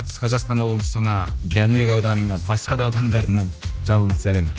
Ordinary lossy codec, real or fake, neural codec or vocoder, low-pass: none; fake; codec, 16 kHz, 1 kbps, X-Codec, HuBERT features, trained on general audio; none